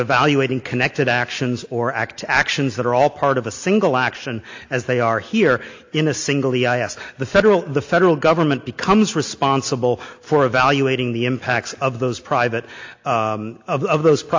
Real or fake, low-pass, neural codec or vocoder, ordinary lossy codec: real; 7.2 kHz; none; AAC, 48 kbps